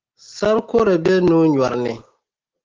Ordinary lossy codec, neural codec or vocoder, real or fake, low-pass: Opus, 16 kbps; none; real; 7.2 kHz